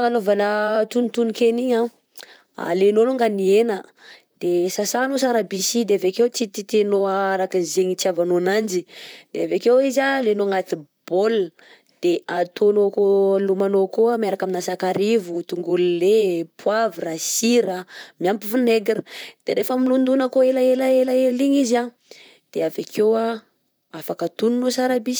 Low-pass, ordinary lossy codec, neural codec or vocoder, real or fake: none; none; vocoder, 44.1 kHz, 128 mel bands, Pupu-Vocoder; fake